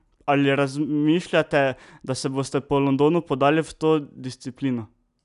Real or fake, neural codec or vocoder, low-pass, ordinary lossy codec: real; none; 10.8 kHz; none